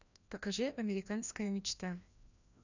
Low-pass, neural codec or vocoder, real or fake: 7.2 kHz; codec, 16 kHz, 1 kbps, FreqCodec, larger model; fake